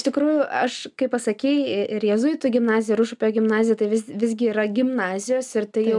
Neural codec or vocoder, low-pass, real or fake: none; 10.8 kHz; real